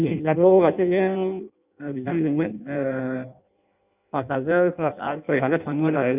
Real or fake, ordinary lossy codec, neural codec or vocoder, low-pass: fake; none; codec, 16 kHz in and 24 kHz out, 0.6 kbps, FireRedTTS-2 codec; 3.6 kHz